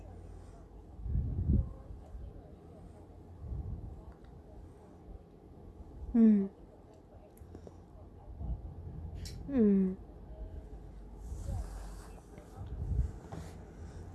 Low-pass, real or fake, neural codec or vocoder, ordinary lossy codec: none; real; none; none